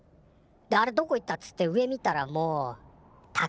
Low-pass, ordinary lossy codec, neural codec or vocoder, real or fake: none; none; none; real